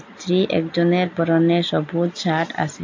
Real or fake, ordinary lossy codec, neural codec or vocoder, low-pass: real; AAC, 48 kbps; none; 7.2 kHz